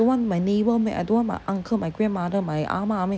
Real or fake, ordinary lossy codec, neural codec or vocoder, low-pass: real; none; none; none